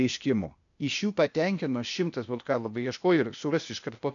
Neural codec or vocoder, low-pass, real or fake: codec, 16 kHz, 0.8 kbps, ZipCodec; 7.2 kHz; fake